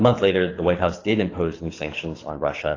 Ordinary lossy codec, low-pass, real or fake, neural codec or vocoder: AAC, 32 kbps; 7.2 kHz; fake; codec, 24 kHz, 6 kbps, HILCodec